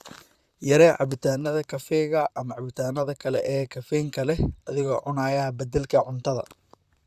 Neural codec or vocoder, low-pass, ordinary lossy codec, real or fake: vocoder, 44.1 kHz, 128 mel bands, Pupu-Vocoder; 14.4 kHz; Opus, 64 kbps; fake